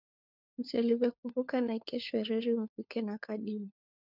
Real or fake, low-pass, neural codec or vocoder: fake; 5.4 kHz; codec, 16 kHz, 4 kbps, FunCodec, trained on LibriTTS, 50 frames a second